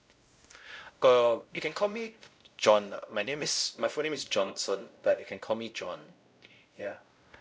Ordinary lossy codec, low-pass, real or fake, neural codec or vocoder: none; none; fake; codec, 16 kHz, 0.5 kbps, X-Codec, WavLM features, trained on Multilingual LibriSpeech